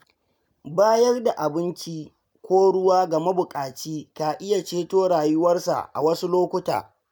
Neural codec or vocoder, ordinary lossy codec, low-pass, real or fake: none; none; none; real